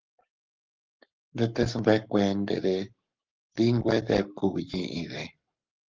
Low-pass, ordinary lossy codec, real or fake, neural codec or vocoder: 7.2 kHz; Opus, 32 kbps; fake; codec, 44.1 kHz, 7.8 kbps, Pupu-Codec